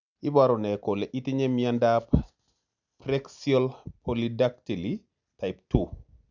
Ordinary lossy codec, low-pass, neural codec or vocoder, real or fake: none; 7.2 kHz; none; real